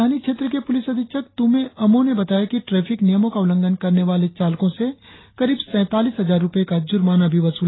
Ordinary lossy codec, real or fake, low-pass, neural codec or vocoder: AAC, 16 kbps; real; 7.2 kHz; none